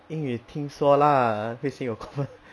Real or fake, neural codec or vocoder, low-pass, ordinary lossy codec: real; none; none; none